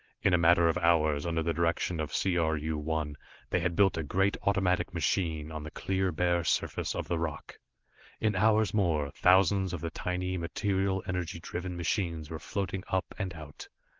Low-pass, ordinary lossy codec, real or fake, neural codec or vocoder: 7.2 kHz; Opus, 16 kbps; real; none